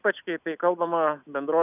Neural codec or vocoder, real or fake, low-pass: none; real; 3.6 kHz